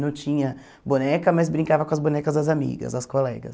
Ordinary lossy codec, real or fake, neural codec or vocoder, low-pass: none; real; none; none